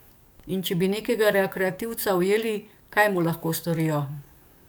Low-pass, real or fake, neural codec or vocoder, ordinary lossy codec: none; fake; codec, 44.1 kHz, 7.8 kbps, DAC; none